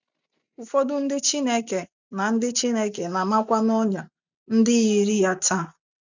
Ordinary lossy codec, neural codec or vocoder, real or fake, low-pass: none; none; real; 7.2 kHz